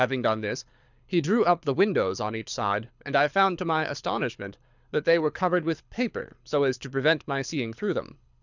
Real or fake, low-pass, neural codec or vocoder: fake; 7.2 kHz; codec, 24 kHz, 6 kbps, HILCodec